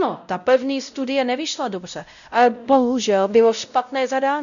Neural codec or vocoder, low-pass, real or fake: codec, 16 kHz, 0.5 kbps, X-Codec, WavLM features, trained on Multilingual LibriSpeech; 7.2 kHz; fake